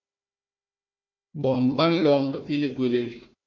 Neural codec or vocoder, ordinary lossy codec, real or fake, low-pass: codec, 16 kHz, 1 kbps, FunCodec, trained on Chinese and English, 50 frames a second; MP3, 48 kbps; fake; 7.2 kHz